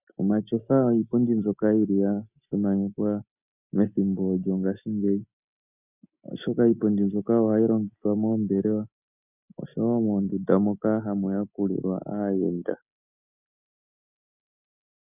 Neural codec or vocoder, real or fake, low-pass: none; real; 3.6 kHz